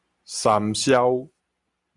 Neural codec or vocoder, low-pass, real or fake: none; 10.8 kHz; real